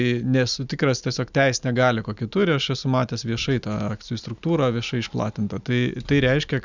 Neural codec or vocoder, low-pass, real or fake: none; 7.2 kHz; real